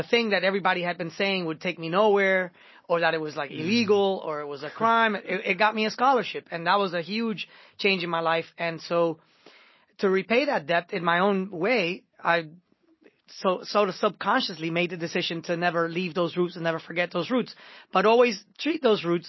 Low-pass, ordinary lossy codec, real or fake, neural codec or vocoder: 7.2 kHz; MP3, 24 kbps; real; none